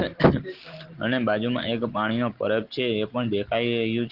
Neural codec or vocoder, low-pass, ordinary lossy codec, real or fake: none; 5.4 kHz; Opus, 16 kbps; real